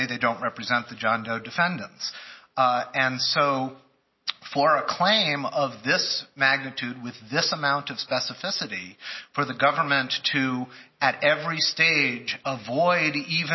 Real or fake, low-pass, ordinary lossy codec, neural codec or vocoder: real; 7.2 kHz; MP3, 24 kbps; none